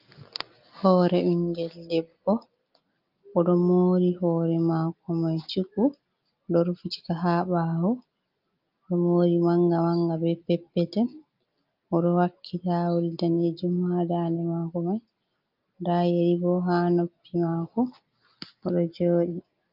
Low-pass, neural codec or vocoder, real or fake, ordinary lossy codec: 5.4 kHz; none; real; Opus, 24 kbps